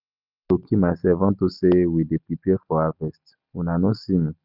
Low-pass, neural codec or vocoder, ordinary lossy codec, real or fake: 5.4 kHz; none; none; real